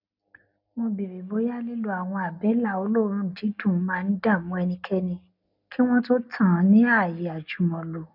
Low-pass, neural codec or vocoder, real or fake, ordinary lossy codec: 5.4 kHz; none; real; none